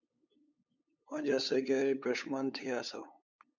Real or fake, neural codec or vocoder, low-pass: fake; codec, 16 kHz, 8 kbps, FunCodec, trained on LibriTTS, 25 frames a second; 7.2 kHz